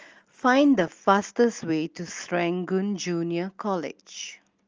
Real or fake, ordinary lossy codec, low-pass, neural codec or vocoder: real; Opus, 24 kbps; 7.2 kHz; none